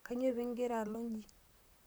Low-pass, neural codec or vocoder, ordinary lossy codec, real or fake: none; vocoder, 44.1 kHz, 128 mel bands, Pupu-Vocoder; none; fake